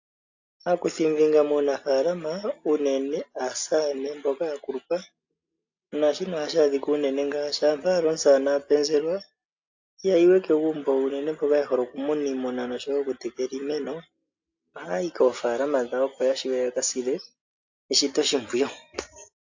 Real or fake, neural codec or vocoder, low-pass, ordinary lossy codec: real; none; 7.2 kHz; AAC, 48 kbps